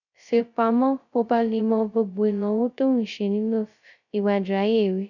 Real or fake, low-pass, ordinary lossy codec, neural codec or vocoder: fake; 7.2 kHz; none; codec, 16 kHz, 0.2 kbps, FocalCodec